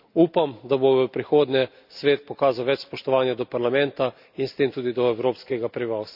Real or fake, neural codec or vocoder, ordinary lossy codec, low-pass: real; none; none; 5.4 kHz